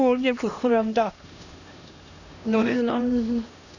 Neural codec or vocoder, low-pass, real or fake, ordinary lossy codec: codec, 16 kHz, 1 kbps, X-Codec, HuBERT features, trained on LibriSpeech; 7.2 kHz; fake; none